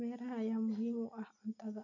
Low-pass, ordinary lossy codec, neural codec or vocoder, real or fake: 7.2 kHz; none; none; real